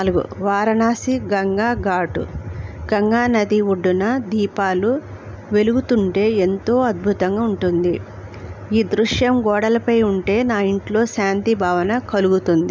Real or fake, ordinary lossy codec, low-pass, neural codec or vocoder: real; none; none; none